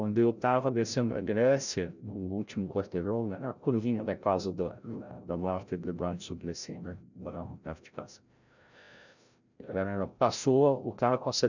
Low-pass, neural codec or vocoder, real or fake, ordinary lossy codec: 7.2 kHz; codec, 16 kHz, 0.5 kbps, FreqCodec, larger model; fake; none